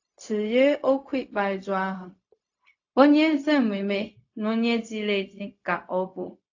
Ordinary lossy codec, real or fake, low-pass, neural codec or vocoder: AAC, 48 kbps; fake; 7.2 kHz; codec, 16 kHz, 0.4 kbps, LongCat-Audio-Codec